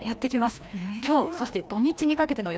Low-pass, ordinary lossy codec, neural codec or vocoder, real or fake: none; none; codec, 16 kHz, 1 kbps, FreqCodec, larger model; fake